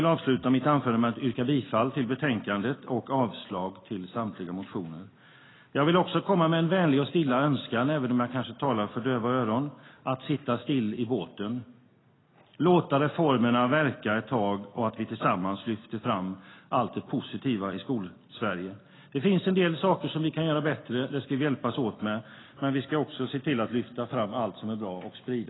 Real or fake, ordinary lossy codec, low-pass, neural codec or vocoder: real; AAC, 16 kbps; 7.2 kHz; none